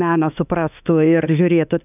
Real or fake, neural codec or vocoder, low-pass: fake; autoencoder, 48 kHz, 32 numbers a frame, DAC-VAE, trained on Japanese speech; 3.6 kHz